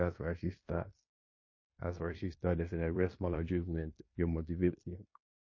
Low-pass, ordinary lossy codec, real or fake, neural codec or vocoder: 7.2 kHz; MP3, 32 kbps; fake; codec, 16 kHz in and 24 kHz out, 0.9 kbps, LongCat-Audio-Codec, fine tuned four codebook decoder